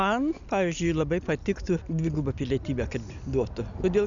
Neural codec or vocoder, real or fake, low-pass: none; real; 7.2 kHz